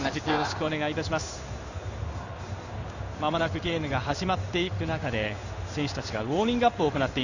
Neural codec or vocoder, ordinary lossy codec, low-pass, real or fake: codec, 16 kHz in and 24 kHz out, 1 kbps, XY-Tokenizer; none; 7.2 kHz; fake